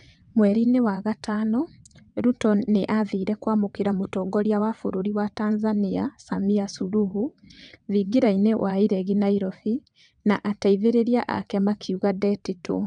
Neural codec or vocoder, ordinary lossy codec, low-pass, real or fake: vocoder, 22.05 kHz, 80 mel bands, WaveNeXt; none; 9.9 kHz; fake